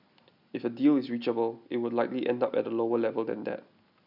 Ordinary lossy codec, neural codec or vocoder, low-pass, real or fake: none; none; 5.4 kHz; real